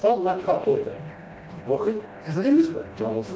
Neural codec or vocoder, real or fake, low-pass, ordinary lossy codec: codec, 16 kHz, 1 kbps, FreqCodec, smaller model; fake; none; none